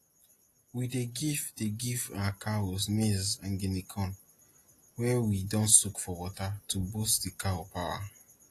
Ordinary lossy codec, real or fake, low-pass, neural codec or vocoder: AAC, 48 kbps; real; 14.4 kHz; none